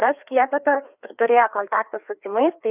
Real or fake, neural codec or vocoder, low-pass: fake; codec, 16 kHz, 2 kbps, FreqCodec, larger model; 3.6 kHz